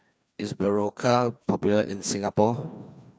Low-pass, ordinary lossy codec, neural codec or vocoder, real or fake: none; none; codec, 16 kHz, 2 kbps, FreqCodec, larger model; fake